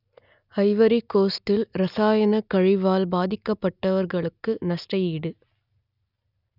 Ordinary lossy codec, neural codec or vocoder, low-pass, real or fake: none; none; 5.4 kHz; real